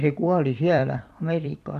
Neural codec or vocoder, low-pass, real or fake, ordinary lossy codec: vocoder, 44.1 kHz, 128 mel bands every 256 samples, BigVGAN v2; 14.4 kHz; fake; MP3, 64 kbps